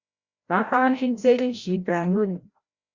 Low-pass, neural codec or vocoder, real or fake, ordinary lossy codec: 7.2 kHz; codec, 16 kHz, 0.5 kbps, FreqCodec, larger model; fake; Opus, 64 kbps